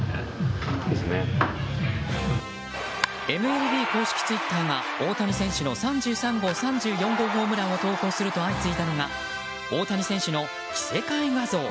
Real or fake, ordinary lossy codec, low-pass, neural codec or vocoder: real; none; none; none